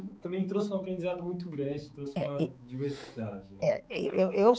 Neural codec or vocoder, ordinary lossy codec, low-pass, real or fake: codec, 16 kHz, 4 kbps, X-Codec, HuBERT features, trained on balanced general audio; none; none; fake